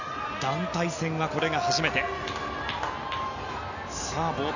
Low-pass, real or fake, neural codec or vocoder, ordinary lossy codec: 7.2 kHz; real; none; none